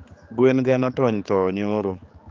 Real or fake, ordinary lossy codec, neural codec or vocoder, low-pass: fake; Opus, 24 kbps; codec, 16 kHz, 4 kbps, X-Codec, HuBERT features, trained on general audio; 7.2 kHz